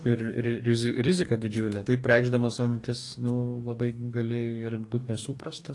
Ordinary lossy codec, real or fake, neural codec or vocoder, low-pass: AAC, 48 kbps; fake; codec, 44.1 kHz, 2.6 kbps, DAC; 10.8 kHz